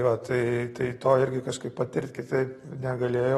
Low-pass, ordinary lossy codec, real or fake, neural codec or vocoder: 19.8 kHz; AAC, 32 kbps; real; none